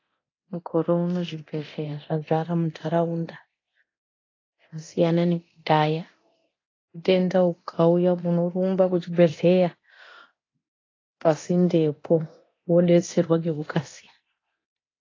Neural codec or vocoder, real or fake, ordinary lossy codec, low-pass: codec, 24 kHz, 0.9 kbps, DualCodec; fake; AAC, 32 kbps; 7.2 kHz